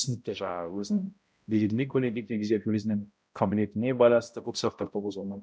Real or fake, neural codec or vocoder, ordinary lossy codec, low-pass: fake; codec, 16 kHz, 0.5 kbps, X-Codec, HuBERT features, trained on balanced general audio; none; none